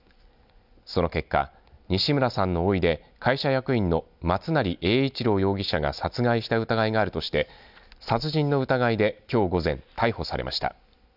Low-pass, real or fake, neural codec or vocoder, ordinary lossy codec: 5.4 kHz; real; none; none